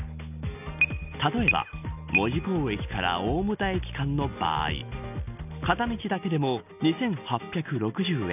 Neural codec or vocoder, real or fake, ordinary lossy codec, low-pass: none; real; none; 3.6 kHz